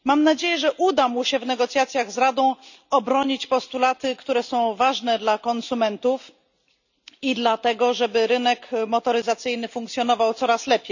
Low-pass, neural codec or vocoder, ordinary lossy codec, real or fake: 7.2 kHz; none; none; real